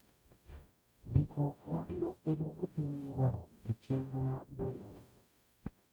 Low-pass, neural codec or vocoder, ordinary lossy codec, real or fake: none; codec, 44.1 kHz, 0.9 kbps, DAC; none; fake